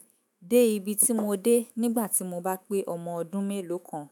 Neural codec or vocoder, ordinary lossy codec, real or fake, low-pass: autoencoder, 48 kHz, 128 numbers a frame, DAC-VAE, trained on Japanese speech; none; fake; none